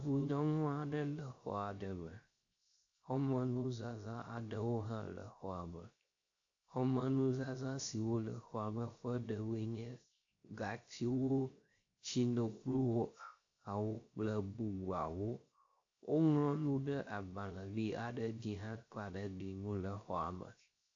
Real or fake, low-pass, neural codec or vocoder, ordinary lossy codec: fake; 7.2 kHz; codec, 16 kHz, 0.3 kbps, FocalCodec; AAC, 48 kbps